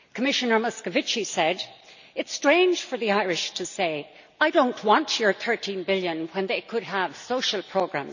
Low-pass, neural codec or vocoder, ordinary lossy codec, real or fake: 7.2 kHz; none; none; real